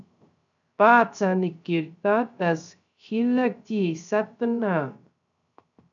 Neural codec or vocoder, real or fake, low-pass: codec, 16 kHz, 0.3 kbps, FocalCodec; fake; 7.2 kHz